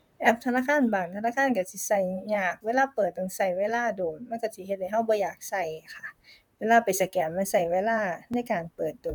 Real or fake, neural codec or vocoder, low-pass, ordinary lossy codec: fake; vocoder, 44.1 kHz, 128 mel bands, Pupu-Vocoder; 19.8 kHz; none